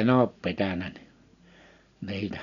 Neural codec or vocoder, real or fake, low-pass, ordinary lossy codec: none; real; 7.2 kHz; none